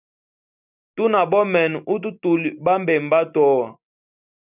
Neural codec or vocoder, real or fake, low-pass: none; real; 3.6 kHz